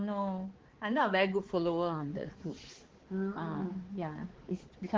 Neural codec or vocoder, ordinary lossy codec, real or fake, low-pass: codec, 16 kHz, 4 kbps, X-Codec, HuBERT features, trained on balanced general audio; Opus, 16 kbps; fake; 7.2 kHz